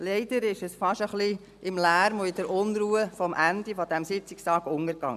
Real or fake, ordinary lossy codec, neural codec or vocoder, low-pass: real; MP3, 96 kbps; none; 14.4 kHz